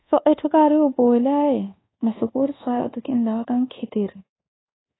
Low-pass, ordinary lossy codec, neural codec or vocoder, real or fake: 7.2 kHz; AAC, 16 kbps; codec, 24 kHz, 1.2 kbps, DualCodec; fake